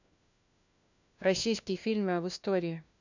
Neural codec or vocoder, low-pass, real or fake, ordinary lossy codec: codec, 16 kHz, 1 kbps, FunCodec, trained on LibriTTS, 50 frames a second; 7.2 kHz; fake; none